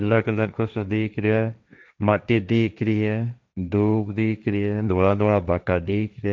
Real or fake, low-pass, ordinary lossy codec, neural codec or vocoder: fake; none; none; codec, 16 kHz, 1.1 kbps, Voila-Tokenizer